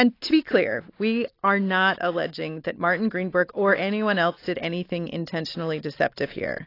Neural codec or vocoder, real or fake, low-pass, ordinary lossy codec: none; real; 5.4 kHz; AAC, 32 kbps